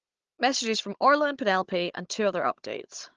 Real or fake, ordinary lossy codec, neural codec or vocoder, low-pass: fake; Opus, 16 kbps; codec, 16 kHz, 16 kbps, FunCodec, trained on Chinese and English, 50 frames a second; 7.2 kHz